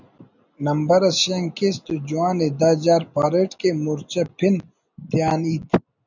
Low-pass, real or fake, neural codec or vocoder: 7.2 kHz; real; none